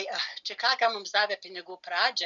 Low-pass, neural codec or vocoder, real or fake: 7.2 kHz; none; real